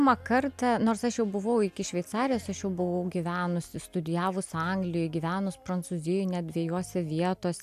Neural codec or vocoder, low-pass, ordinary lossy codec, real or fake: none; 14.4 kHz; AAC, 96 kbps; real